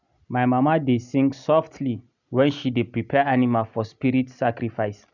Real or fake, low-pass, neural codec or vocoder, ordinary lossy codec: real; 7.2 kHz; none; none